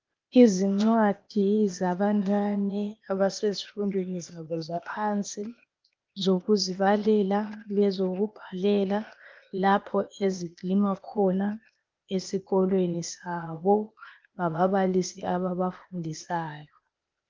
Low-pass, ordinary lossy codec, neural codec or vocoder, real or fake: 7.2 kHz; Opus, 32 kbps; codec, 16 kHz, 0.8 kbps, ZipCodec; fake